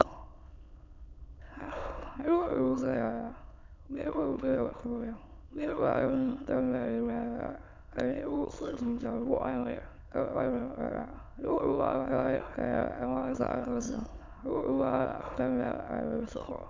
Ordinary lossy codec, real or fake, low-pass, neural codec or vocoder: MP3, 64 kbps; fake; 7.2 kHz; autoencoder, 22.05 kHz, a latent of 192 numbers a frame, VITS, trained on many speakers